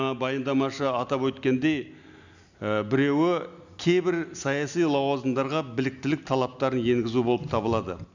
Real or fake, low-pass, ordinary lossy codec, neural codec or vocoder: real; 7.2 kHz; none; none